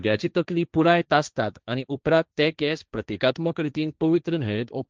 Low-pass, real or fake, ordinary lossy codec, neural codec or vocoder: 7.2 kHz; fake; Opus, 24 kbps; codec, 16 kHz, 1.1 kbps, Voila-Tokenizer